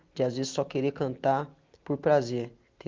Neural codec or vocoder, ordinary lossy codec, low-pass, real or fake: none; Opus, 32 kbps; 7.2 kHz; real